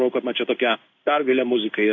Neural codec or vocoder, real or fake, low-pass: codec, 16 kHz in and 24 kHz out, 1 kbps, XY-Tokenizer; fake; 7.2 kHz